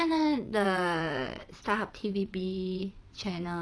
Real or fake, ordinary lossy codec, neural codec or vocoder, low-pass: fake; none; vocoder, 22.05 kHz, 80 mel bands, WaveNeXt; none